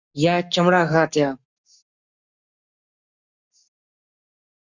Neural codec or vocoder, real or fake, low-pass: codec, 44.1 kHz, 7.8 kbps, Pupu-Codec; fake; 7.2 kHz